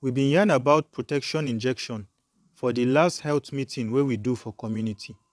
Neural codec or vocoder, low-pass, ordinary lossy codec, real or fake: vocoder, 22.05 kHz, 80 mel bands, WaveNeXt; none; none; fake